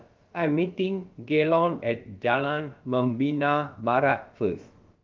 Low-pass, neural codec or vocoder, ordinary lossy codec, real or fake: 7.2 kHz; codec, 16 kHz, about 1 kbps, DyCAST, with the encoder's durations; Opus, 24 kbps; fake